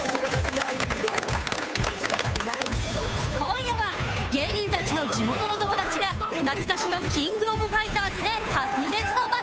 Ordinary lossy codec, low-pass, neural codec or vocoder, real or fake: none; none; codec, 16 kHz, 2 kbps, FunCodec, trained on Chinese and English, 25 frames a second; fake